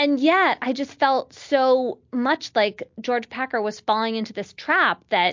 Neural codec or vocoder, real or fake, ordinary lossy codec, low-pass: none; real; MP3, 48 kbps; 7.2 kHz